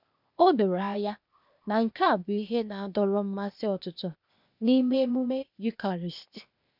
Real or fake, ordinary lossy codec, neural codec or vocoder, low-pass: fake; none; codec, 16 kHz, 0.8 kbps, ZipCodec; 5.4 kHz